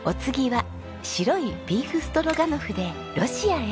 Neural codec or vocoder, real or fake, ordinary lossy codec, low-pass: none; real; none; none